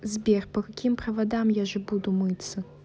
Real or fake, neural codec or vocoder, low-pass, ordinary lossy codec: real; none; none; none